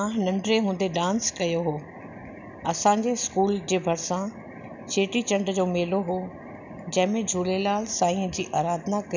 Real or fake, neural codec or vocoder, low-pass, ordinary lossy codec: real; none; 7.2 kHz; none